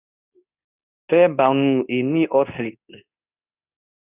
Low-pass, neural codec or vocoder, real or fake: 3.6 kHz; codec, 24 kHz, 0.9 kbps, WavTokenizer, medium speech release version 2; fake